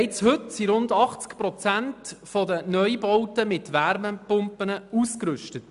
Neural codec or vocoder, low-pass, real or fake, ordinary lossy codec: none; 10.8 kHz; real; none